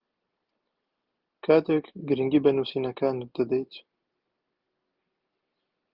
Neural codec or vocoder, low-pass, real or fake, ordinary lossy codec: none; 5.4 kHz; real; Opus, 32 kbps